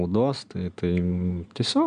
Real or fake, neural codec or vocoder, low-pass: real; none; 9.9 kHz